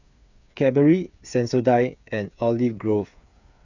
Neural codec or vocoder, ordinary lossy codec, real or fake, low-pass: codec, 16 kHz, 8 kbps, FreqCodec, smaller model; none; fake; 7.2 kHz